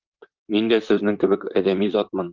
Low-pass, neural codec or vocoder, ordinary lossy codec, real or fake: 7.2 kHz; vocoder, 44.1 kHz, 128 mel bands, Pupu-Vocoder; Opus, 24 kbps; fake